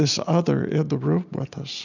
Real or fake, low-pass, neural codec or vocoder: real; 7.2 kHz; none